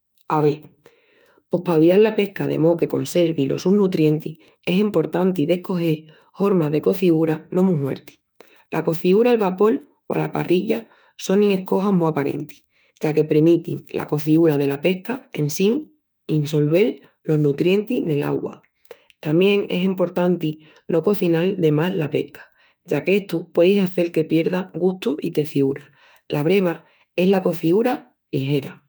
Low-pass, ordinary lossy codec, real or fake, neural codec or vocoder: none; none; fake; autoencoder, 48 kHz, 32 numbers a frame, DAC-VAE, trained on Japanese speech